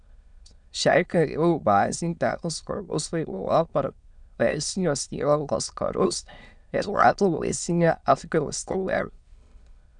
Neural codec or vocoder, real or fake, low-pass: autoencoder, 22.05 kHz, a latent of 192 numbers a frame, VITS, trained on many speakers; fake; 9.9 kHz